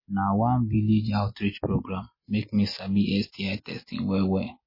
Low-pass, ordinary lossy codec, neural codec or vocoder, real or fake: 5.4 kHz; MP3, 24 kbps; none; real